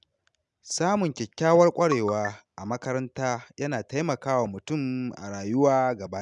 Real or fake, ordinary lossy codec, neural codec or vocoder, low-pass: real; none; none; 10.8 kHz